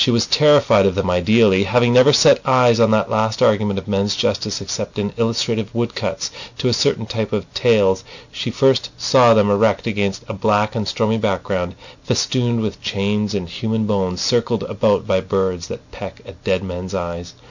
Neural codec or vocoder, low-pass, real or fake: none; 7.2 kHz; real